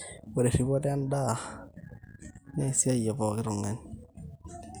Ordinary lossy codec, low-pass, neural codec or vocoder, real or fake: none; none; none; real